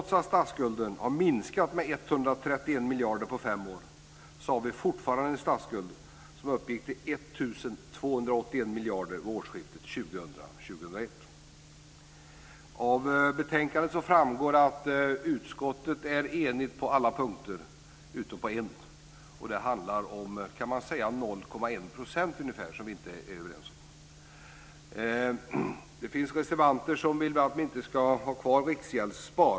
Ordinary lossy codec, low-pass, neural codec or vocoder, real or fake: none; none; none; real